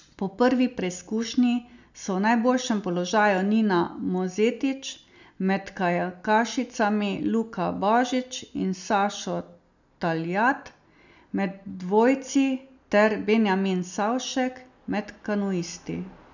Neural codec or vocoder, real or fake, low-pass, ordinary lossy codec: none; real; 7.2 kHz; none